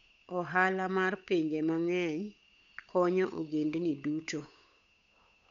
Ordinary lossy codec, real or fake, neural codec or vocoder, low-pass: none; fake; codec, 16 kHz, 8 kbps, FunCodec, trained on Chinese and English, 25 frames a second; 7.2 kHz